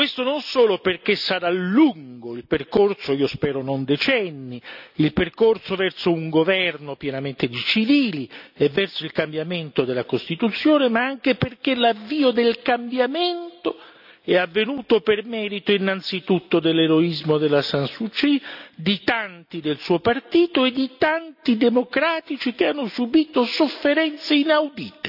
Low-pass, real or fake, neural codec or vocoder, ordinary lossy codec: 5.4 kHz; real; none; none